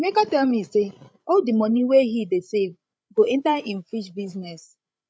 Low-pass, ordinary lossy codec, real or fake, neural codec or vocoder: none; none; fake; codec, 16 kHz, 16 kbps, FreqCodec, larger model